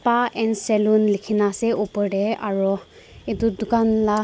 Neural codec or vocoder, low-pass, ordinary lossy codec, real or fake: none; none; none; real